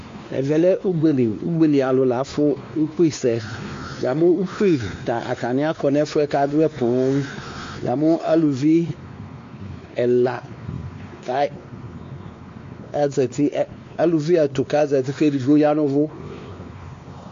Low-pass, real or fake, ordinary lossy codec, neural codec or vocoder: 7.2 kHz; fake; AAC, 48 kbps; codec, 16 kHz, 2 kbps, X-Codec, WavLM features, trained on Multilingual LibriSpeech